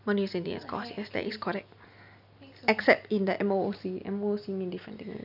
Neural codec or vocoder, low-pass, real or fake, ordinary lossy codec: none; 5.4 kHz; real; none